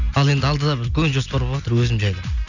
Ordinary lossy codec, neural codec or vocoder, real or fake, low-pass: none; none; real; 7.2 kHz